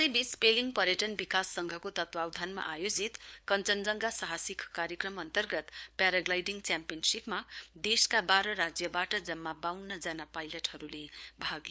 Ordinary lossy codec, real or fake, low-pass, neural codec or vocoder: none; fake; none; codec, 16 kHz, 4 kbps, FunCodec, trained on LibriTTS, 50 frames a second